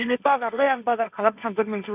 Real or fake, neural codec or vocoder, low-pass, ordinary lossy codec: fake; codec, 16 kHz, 1.1 kbps, Voila-Tokenizer; 3.6 kHz; none